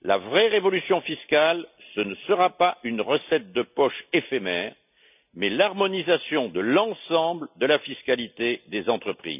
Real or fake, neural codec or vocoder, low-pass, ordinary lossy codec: real; none; 3.6 kHz; none